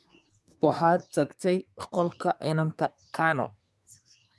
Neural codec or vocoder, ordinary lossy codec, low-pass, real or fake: codec, 24 kHz, 1 kbps, SNAC; none; none; fake